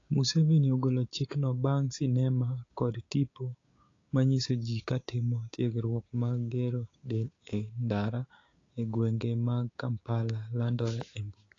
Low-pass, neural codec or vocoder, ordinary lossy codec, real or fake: 7.2 kHz; codec, 16 kHz, 6 kbps, DAC; AAC, 32 kbps; fake